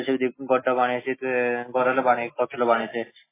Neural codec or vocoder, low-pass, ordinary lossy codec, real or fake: none; 3.6 kHz; MP3, 16 kbps; real